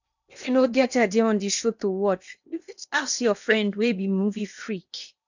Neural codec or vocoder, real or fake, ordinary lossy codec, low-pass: codec, 16 kHz in and 24 kHz out, 0.8 kbps, FocalCodec, streaming, 65536 codes; fake; none; 7.2 kHz